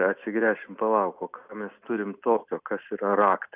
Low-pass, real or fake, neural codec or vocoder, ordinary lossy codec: 3.6 kHz; real; none; Opus, 64 kbps